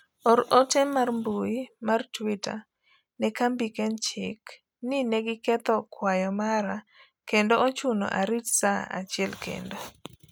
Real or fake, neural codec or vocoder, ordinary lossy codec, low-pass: real; none; none; none